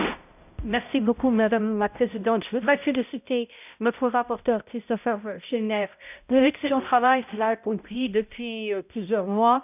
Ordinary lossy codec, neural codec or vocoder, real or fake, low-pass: none; codec, 16 kHz, 0.5 kbps, X-Codec, HuBERT features, trained on balanced general audio; fake; 3.6 kHz